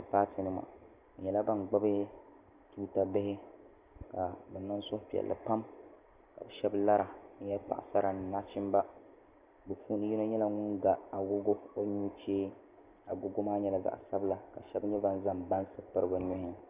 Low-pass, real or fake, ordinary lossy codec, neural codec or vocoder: 3.6 kHz; real; Opus, 64 kbps; none